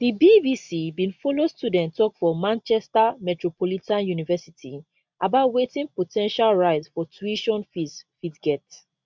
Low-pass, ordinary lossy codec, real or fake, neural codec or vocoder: 7.2 kHz; MP3, 64 kbps; real; none